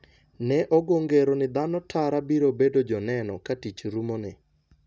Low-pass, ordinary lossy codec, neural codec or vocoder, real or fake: none; none; none; real